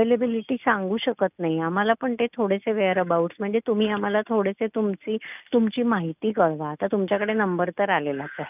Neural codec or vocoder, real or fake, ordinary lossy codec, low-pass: none; real; none; 3.6 kHz